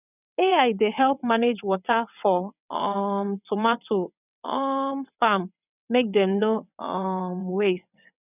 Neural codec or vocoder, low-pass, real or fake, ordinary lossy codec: vocoder, 22.05 kHz, 80 mel bands, WaveNeXt; 3.6 kHz; fake; none